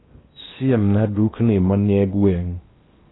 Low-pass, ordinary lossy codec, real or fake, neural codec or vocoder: 7.2 kHz; AAC, 16 kbps; fake; codec, 16 kHz in and 24 kHz out, 0.8 kbps, FocalCodec, streaming, 65536 codes